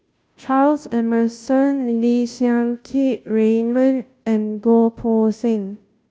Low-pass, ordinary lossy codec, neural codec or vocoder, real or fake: none; none; codec, 16 kHz, 0.5 kbps, FunCodec, trained on Chinese and English, 25 frames a second; fake